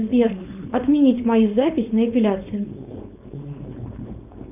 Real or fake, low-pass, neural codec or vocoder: fake; 3.6 kHz; codec, 16 kHz, 4.8 kbps, FACodec